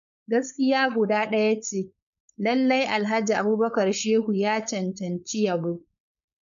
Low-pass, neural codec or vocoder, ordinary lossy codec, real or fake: 7.2 kHz; codec, 16 kHz, 4.8 kbps, FACodec; none; fake